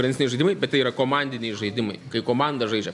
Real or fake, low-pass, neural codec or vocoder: real; 10.8 kHz; none